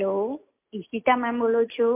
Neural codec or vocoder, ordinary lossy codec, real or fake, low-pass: none; MP3, 32 kbps; real; 3.6 kHz